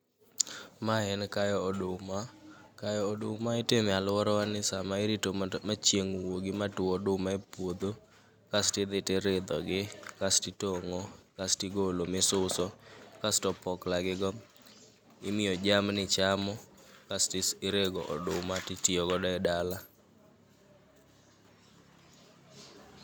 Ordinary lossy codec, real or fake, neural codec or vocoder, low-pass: none; real; none; none